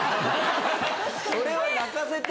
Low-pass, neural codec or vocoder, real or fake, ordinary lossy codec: none; none; real; none